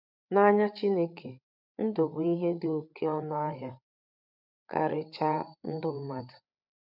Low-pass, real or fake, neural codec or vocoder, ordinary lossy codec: 5.4 kHz; fake; codec, 16 kHz, 8 kbps, FreqCodec, larger model; none